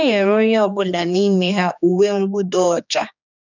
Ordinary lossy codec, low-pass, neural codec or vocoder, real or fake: none; 7.2 kHz; codec, 16 kHz, 2 kbps, X-Codec, HuBERT features, trained on general audio; fake